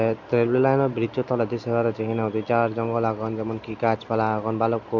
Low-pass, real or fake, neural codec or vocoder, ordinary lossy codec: 7.2 kHz; real; none; none